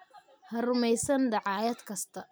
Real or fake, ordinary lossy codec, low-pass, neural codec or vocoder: real; none; none; none